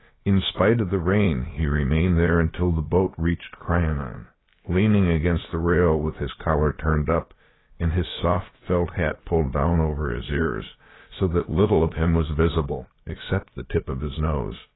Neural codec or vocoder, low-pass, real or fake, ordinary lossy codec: codec, 16 kHz, about 1 kbps, DyCAST, with the encoder's durations; 7.2 kHz; fake; AAC, 16 kbps